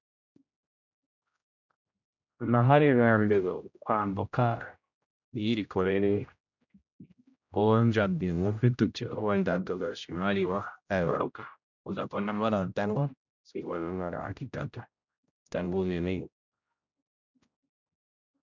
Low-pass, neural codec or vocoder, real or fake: 7.2 kHz; codec, 16 kHz, 0.5 kbps, X-Codec, HuBERT features, trained on general audio; fake